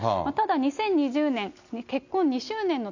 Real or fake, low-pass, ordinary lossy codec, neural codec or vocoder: real; 7.2 kHz; none; none